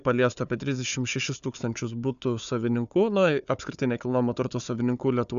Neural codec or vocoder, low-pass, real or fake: codec, 16 kHz, 4 kbps, FunCodec, trained on Chinese and English, 50 frames a second; 7.2 kHz; fake